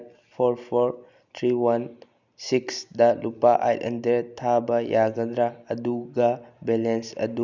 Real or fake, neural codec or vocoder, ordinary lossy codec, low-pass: real; none; none; 7.2 kHz